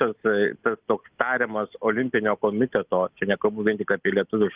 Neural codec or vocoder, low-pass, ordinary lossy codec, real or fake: none; 3.6 kHz; Opus, 32 kbps; real